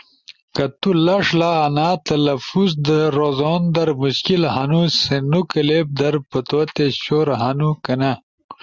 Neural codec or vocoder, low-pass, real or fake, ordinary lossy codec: none; 7.2 kHz; real; Opus, 64 kbps